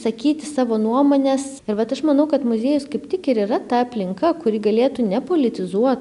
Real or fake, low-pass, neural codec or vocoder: real; 10.8 kHz; none